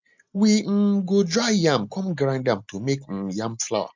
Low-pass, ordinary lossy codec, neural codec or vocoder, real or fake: 7.2 kHz; MP3, 64 kbps; none; real